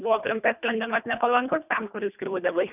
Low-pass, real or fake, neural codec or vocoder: 3.6 kHz; fake; codec, 24 kHz, 1.5 kbps, HILCodec